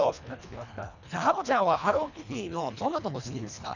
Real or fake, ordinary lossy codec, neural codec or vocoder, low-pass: fake; none; codec, 24 kHz, 1.5 kbps, HILCodec; 7.2 kHz